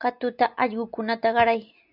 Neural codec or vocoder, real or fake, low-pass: none; real; 5.4 kHz